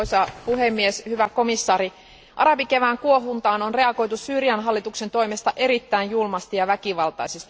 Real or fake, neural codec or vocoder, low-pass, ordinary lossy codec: real; none; none; none